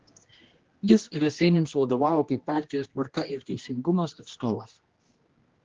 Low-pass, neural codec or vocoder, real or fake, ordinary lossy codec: 7.2 kHz; codec, 16 kHz, 1 kbps, X-Codec, HuBERT features, trained on general audio; fake; Opus, 16 kbps